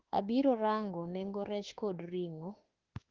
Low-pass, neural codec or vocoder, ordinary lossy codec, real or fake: 7.2 kHz; autoencoder, 48 kHz, 32 numbers a frame, DAC-VAE, trained on Japanese speech; Opus, 16 kbps; fake